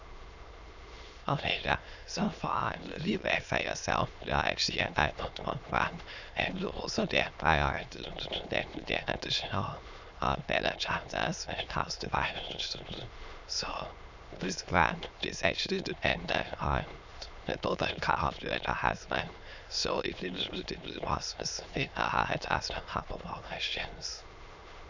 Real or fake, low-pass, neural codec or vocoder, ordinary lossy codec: fake; 7.2 kHz; autoencoder, 22.05 kHz, a latent of 192 numbers a frame, VITS, trained on many speakers; none